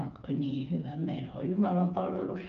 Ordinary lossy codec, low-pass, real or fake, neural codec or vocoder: Opus, 24 kbps; 7.2 kHz; fake; codec, 16 kHz, 4 kbps, FreqCodec, smaller model